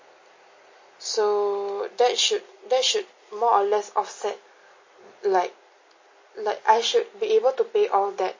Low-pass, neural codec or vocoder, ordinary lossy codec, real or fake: 7.2 kHz; none; MP3, 32 kbps; real